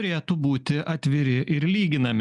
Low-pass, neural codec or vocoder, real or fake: 10.8 kHz; none; real